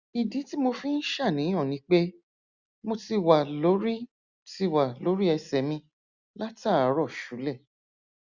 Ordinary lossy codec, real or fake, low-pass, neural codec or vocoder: Opus, 64 kbps; real; 7.2 kHz; none